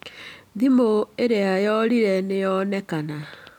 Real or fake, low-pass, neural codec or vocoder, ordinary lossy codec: real; 19.8 kHz; none; none